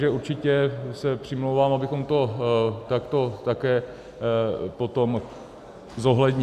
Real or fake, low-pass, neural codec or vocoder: real; 14.4 kHz; none